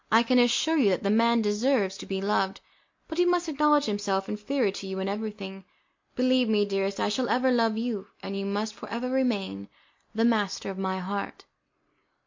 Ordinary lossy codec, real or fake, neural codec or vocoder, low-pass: MP3, 48 kbps; real; none; 7.2 kHz